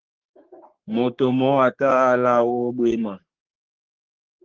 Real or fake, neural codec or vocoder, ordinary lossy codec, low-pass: fake; codec, 44.1 kHz, 3.4 kbps, Pupu-Codec; Opus, 16 kbps; 7.2 kHz